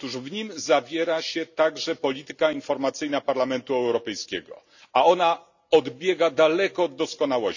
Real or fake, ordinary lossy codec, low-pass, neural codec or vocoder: real; none; 7.2 kHz; none